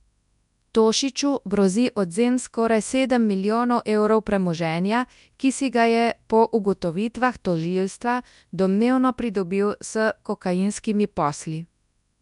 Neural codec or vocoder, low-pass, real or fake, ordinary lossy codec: codec, 24 kHz, 0.9 kbps, WavTokenizer, large speech release; 10.8 kHz; fake; none